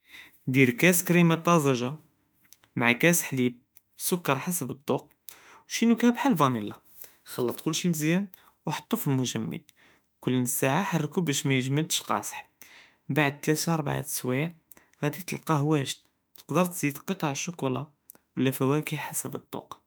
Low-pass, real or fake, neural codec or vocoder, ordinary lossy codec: none; fake; autoencoder, 48 kHz, 32 numbers a frame, DAC-VAE, trained on Japanese speech; none